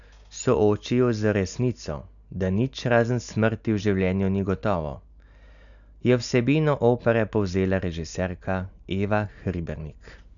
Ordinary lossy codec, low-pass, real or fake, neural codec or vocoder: none; 7.2 kHz; real; none